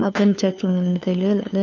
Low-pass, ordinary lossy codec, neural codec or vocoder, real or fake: 7.2 kHz; none; codec, 16 kHz, 2 kbps, FunCodec, trained on LibriTTS, 25 frames a second; fake